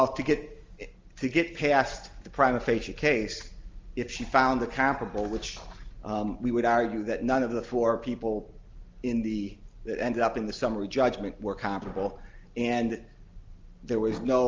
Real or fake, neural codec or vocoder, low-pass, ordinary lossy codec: real; none; 7.2 kHz; Opus, 32 kbps